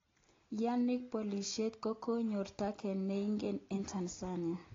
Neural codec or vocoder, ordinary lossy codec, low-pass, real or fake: none; AAC, 32 kbps; 7.2 kHz; real